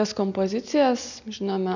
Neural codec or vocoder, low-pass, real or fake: none; 7.2 kHz; real